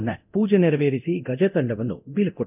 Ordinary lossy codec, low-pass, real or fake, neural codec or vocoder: none; 3.6 kHz; fake; codec, 24 kHz, 0.9 kbps, DualCodec